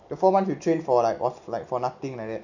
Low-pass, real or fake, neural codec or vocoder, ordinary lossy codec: 7.2 kHz; real; none; none